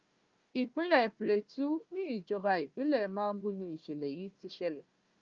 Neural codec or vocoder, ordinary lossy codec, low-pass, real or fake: codec, 16 kHz, 1 kbps, FunCodec, trained on Chinese and English, 50 frames a second; Opus, 24 kbps; 7.2 kHz; fake